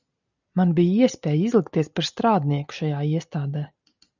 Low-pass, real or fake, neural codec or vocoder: 7.2 kHz; real; none